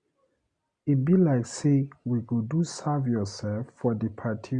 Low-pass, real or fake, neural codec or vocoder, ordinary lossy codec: 9.9 kHz; real; none; Opus, 64 kbps